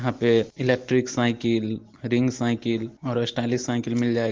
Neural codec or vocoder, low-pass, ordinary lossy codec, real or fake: none; 7.2 kHz; Opus, 16 kbps; real